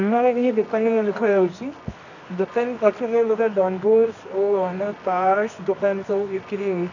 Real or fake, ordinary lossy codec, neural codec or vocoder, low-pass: fake; none; codec, 24 kHz, 0.9 kbps, WavTokenizer, medium music audio release; 7.2 kHz